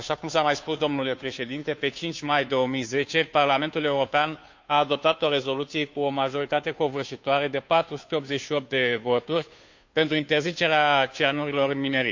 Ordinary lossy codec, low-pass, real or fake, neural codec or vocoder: MP3, 64 kbps; 7.2 kHz; fake; codec, 16 kHz, 2 kbps, FunCodec, trained on Chinese and English, 25 frames a second